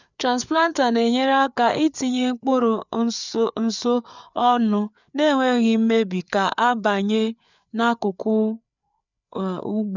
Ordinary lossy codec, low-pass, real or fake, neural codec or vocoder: none; 7.2 kHz; fake; codec, 16 kHz, 4 kbps, FreqCodec, larger model